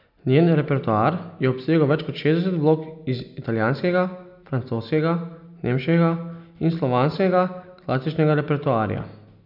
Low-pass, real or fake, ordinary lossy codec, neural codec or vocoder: 5.4 kHz; real; none; none